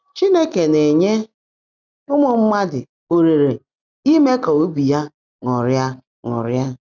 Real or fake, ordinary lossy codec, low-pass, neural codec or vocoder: real; none; 7.2 kHz; none